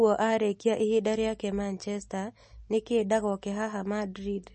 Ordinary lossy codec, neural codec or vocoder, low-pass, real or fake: MP3, 32 kbps; none; 10.8 kHz; real